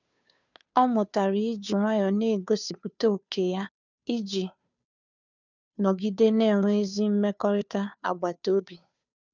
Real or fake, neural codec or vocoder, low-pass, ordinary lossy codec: fake; codec, 16 kHz, 2 kbps, FunCodec, trained on Chinese and English, 25 frames a second; 7.2 kHz; none